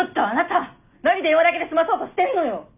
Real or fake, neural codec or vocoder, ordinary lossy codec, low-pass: real; none; none; 3.6 kHz